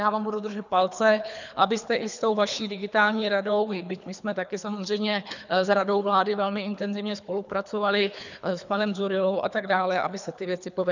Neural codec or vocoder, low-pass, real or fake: codec, 24 kHz, 3 kbps, HILCodec; 7.2 kHz; fake